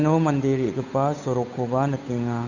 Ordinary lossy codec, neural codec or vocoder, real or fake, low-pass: none; codec, 16 kHz, 8 kbps, FunCodec, trained on Chinese and English, 25 frames a second; fake; 7.2 kHz